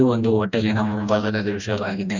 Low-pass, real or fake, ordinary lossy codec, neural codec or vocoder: 7.2 kHz; fake; none; codec, 16 kHz, 1 kbps, FreqCodec, smaller model